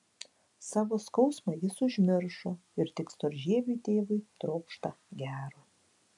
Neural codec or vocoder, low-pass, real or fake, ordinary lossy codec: none; 10.8 kHz; real; AAC, 64 kbps